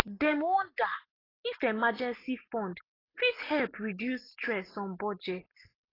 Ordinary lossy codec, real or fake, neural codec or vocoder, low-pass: AAC, 24 kbps; real; none; 5.4 kHz